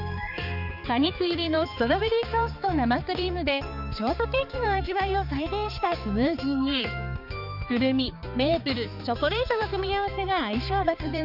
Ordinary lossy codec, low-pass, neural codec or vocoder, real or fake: none; 5.4 kHz; codec, 16 kHz, 4 kbps, X-Codec, HuBERT features, trained on balanced general audio; fake